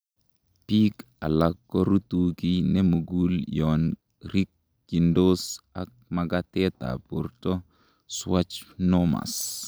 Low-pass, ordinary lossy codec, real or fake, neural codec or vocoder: none; none; real; none